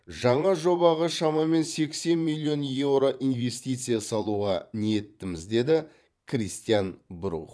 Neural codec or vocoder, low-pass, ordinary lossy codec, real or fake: vocoder, 22.05 kHz, 80 mel bands, Vocos; none; none; fake